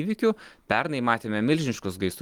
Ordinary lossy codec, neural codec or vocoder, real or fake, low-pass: Opus, 24 kbps; none; real; 19.8 kHz